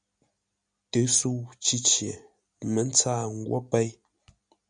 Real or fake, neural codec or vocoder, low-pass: real; none; 9.9 kHz